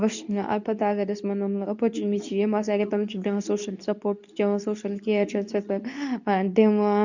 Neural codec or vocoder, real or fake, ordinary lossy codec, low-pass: codec, 24 kHz, 0.9 kbps, WavTokenizer, medium speech release version 2; fake; none; 7.2 kHz